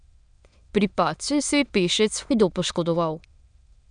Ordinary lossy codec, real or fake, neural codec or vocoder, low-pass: none; fake; autoencoder, 22.05 kHz, a latent of 192 numbers a frame, VITS, trained on many speakers; 9.9 kHz